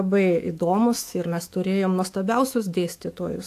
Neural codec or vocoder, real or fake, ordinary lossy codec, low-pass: autoencoder, 48 kHz, 128 numbers a frame, DAC-VAE, trained on Japanese speech; fake; AAC, 64 kbps; 14.4 kHz